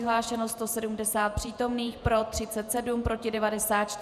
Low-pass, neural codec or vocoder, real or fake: 14.4 kHz; vocoder, 48 kHz, 128 mel bands, Vocos; fake